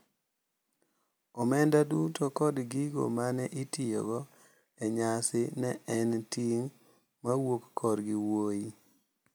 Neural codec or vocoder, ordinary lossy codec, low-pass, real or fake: none; none; none; real